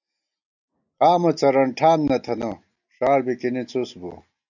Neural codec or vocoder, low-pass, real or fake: none; 7.2 kHz; real